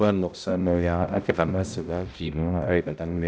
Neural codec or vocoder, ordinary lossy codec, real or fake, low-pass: codec, 16 kHz, 0.5 kbps, X-Codec, HuBERT features, trained on balanced general audio; none; fake; none